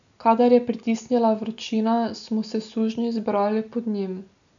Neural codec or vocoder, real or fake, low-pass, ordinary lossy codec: none; real; 7.2 kHz; none